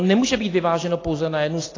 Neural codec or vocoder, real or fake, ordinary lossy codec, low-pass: none; real; AAC, 32 kbps; 7.2 kHz